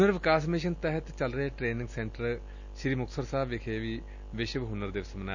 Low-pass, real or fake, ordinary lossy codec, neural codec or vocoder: 7.2 kHz; real; none; none